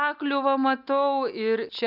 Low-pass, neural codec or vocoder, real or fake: 5.4 kHz; none; real